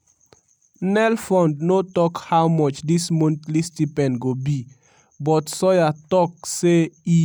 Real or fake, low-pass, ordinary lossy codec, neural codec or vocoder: real; none; none; none